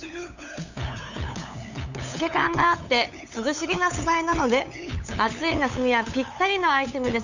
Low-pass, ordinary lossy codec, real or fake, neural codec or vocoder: 7.2 kHz; none; fake; codec, 16 kHz, 8 kbps, FunCodec, trained on LibriTTS, 25 frames a second